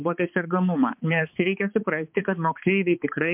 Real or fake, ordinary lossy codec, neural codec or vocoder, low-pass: fake; MP3, 32 kbps; codec, 16 kHz, 4 kbps, X-Codec, HuBERT features, trained on balanced general audio; 3.6 kHz